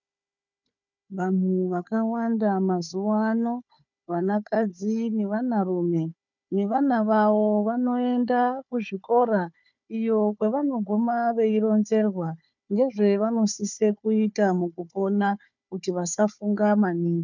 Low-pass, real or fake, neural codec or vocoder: 7.2 kHz; fake; codec, 16 kHz, 4 kbps, FunCodec, trained on Chinese and English, 50 frames a second